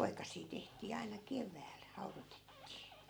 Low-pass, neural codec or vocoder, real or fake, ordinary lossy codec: none; none; real; none